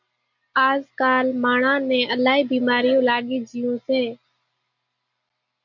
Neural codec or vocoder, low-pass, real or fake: none; 7.2 kHz; real